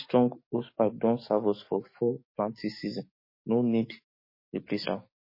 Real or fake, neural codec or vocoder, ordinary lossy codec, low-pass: fake; codec, 44.1 kHz, 7.8 kbps, DAC; MP3, 24 kbps; 5.4 kHz